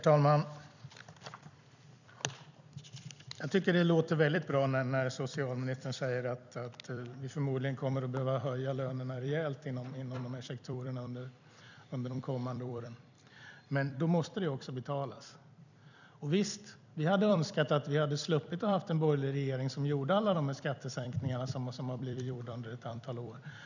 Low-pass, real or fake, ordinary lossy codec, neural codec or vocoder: 7.2 kHz; fake; none; vocoder, 44.1 kHz, 128 mel bands every 256 samples, BigVGAN v2